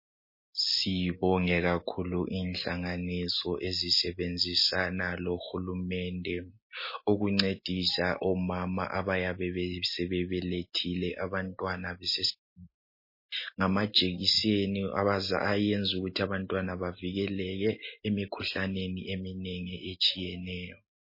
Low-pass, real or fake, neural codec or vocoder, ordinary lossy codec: 5.4 kHz; real; none; MP3, 24 kbps